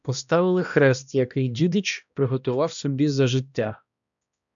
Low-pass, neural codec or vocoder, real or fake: 7.2 kHz; codec, 16 kHz, 1 kbps, X-Codec, HuBERT features, trained on balanced general audio; fake